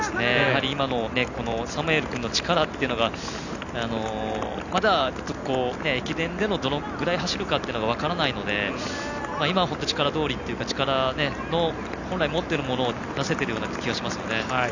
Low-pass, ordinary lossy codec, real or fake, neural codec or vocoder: 7.2 kHz; none; real; none